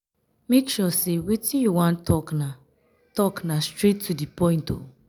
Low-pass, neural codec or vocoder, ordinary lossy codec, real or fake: none; none; none; real